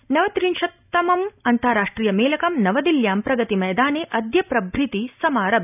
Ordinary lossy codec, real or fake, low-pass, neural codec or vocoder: none; real; 3.6 kHz; none